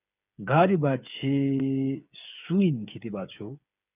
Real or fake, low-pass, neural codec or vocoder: fake; 3.6 kHz; codec, 16 kHz, 8 kbps, FreqCodec, smaller model